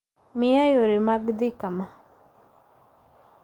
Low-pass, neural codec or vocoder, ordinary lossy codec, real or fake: 19.8 kHz; codec, 44.1 kHz, 7.8 kbps, DAC; Opus, 32 kbps; fake